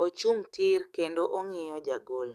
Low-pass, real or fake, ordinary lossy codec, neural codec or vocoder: 14.4 kHz; fake; none; codec, 44.1 kHz, 7.8 kbps, DAC